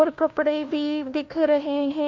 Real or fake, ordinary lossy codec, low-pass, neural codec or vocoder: fake; MP3, 48 kbps; 7.2 kHz; codec, 16 kHz, 1 kbps, FunCodec, trained on LibriTTS, 50 frames a second